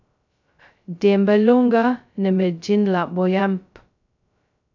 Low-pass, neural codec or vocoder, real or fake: 7.2 kHz; codec, 16 kHz, 0.2 kbps, FocalCodec; fake